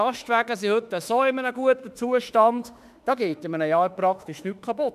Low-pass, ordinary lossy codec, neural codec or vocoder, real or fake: 14.4 kHz; none; autoencoder, 48 kHz, 32 numbers a frame, DAC-VAE, trained on Japanese speech; fake